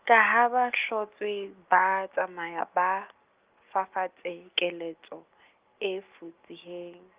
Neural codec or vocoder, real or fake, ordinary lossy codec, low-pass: none; real; Opus, 32 kbps; 3.6 kHz